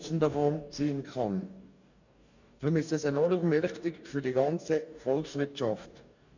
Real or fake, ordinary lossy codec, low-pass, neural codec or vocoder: fake; none; 7.2 kHz; codec, 44.1 kHz, 2.6 kbps, DAC